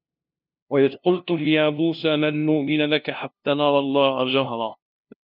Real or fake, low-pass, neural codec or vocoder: fake; 5.4 kHz; codec, 16 kHz, 0.5 kbps, FunCodec, trained on LibriTTS, 25 frames a second